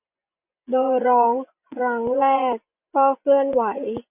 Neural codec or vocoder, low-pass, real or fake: vocoder, 24 kHz, 100 mel bands, Vocos; 3.6 kHz; fake